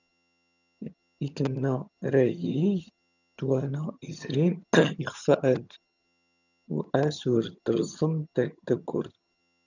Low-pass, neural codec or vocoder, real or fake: 7.2 kHz; vocoder, 22.05 kHz, 80 mel bands, HiFi-GAN; fake